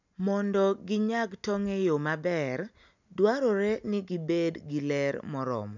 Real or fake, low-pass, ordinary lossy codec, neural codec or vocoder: real; 7.2 kHz; none; none